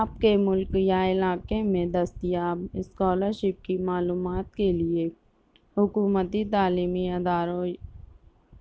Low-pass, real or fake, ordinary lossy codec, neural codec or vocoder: none; real; none; none